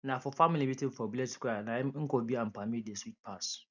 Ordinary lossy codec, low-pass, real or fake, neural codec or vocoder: none; 7.2 kHz; real; none